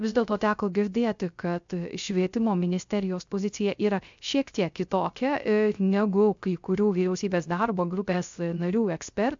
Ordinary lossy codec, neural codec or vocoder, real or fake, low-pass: MP3, 64 kbps; codec, 16 kHz, 0.3 kbps, FocalCodec; fake; 7.2 kHz